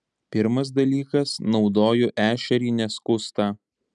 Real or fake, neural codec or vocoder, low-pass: real; none; 10.8 kHz